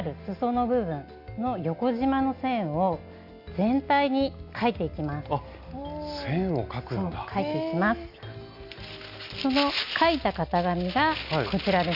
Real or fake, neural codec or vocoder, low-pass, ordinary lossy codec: real; none; 5.4 kHz; Opus, 64 kbps